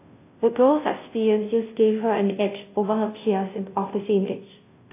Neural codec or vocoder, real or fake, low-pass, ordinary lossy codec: codec, 16 kHz, 0.5 kbps, FunCodec, trained on Chinese and English, 25 frames a second; fake; 3.6 kHz; AAC, 24 kbps